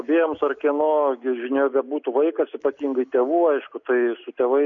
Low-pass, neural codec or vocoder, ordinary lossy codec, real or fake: 7.2 kHz; none; MP3, 96 kbps; real